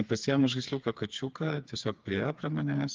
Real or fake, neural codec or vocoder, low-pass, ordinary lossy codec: fake; codec, 16 kHz, 4 kbps, FreqCodec, smaller model; 7.2 kHz; Opus, 24 kbps